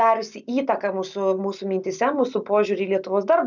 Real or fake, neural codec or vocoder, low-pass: real; none; 7.2 kHz